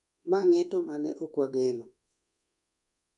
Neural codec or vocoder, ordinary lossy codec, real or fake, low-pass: codec, 24 kHz, 1.2 kbps, DualCodec; none; fake; 10.8 kHz